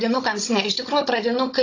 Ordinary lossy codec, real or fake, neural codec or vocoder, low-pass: AAC, 32 kbps; fake; codec, 16 kHz, 16 kbps, FunCodec, trained on Chinese and English, 50 frames a second; 7.2 kHz